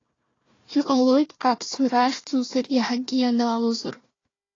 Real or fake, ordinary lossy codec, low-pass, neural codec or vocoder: fake; AAC, 32 kbps; 7.2 kHz; codec, 16 kHz, 1 kbps, FunCodec, trained on Chinese and English, 50 frames a second